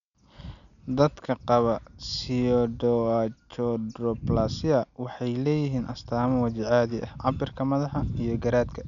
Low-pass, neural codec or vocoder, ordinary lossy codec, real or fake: 7.2 kHz; none; MP3, 96 kbps; real